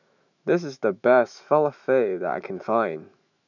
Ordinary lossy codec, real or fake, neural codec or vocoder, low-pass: none; fake; autoencoder, 48 kHz, 128 numbers a frame, DAC-VAE, trained on Japanese speech; 7.2 kHz